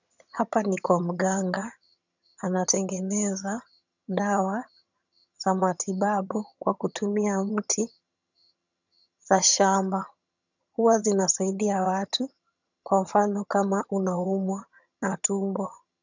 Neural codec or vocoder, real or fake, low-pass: vocoder, 22.05 kHz, 80 mel bands, HiFi-GAN; fake; 7.2 kHz